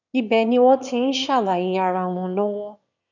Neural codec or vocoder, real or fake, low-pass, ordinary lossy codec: autoencoder, 22.05 kHz, a latent of 192 numbers a frame, VITS, trained on one speaker; fake; 7.2 kHz; none